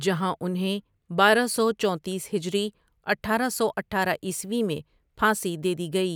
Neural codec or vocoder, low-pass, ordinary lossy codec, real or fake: none; none; none; real